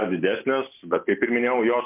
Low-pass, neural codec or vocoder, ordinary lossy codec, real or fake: 3.6 kHz; none; MP3, 32 kbps; real